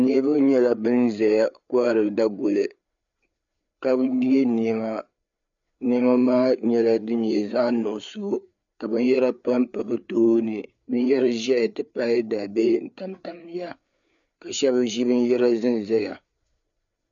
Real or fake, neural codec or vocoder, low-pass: fake; codec, 16 kHz, 4 kbps, FreqCodec, larger model; 7.2 kHz